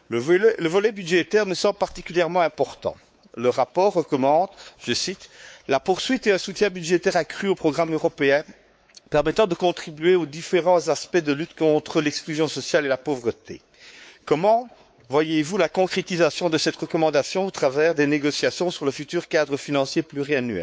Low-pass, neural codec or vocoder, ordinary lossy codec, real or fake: none; codec, 16 kHz, 4 kbps, X-Codec, WavLM features, trained on Multilingual LibriSpeech; none; fake